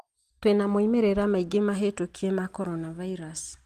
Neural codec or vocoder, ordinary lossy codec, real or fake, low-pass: none; Opus, 24 kbps; real; 14.4 kHz